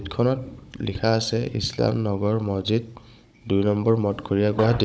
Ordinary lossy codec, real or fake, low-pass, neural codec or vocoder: none; fake; none; codec, 16 kHz, 16 kbps, FunCodec, trained on Chinese and English, 50 frames a second